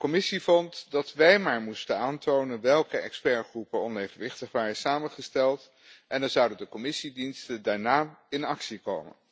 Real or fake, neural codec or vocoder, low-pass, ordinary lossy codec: real; none; none; none